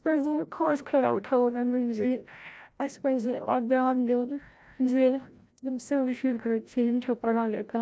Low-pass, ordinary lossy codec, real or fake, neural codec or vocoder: none; none; fake; codec, 16 kHz, 0.5 kbps, FreqCodec, larger model